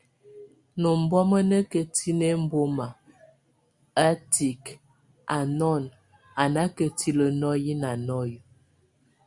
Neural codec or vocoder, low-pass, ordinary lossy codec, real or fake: none; 10.8 kHz; Opus, 64 kbps; real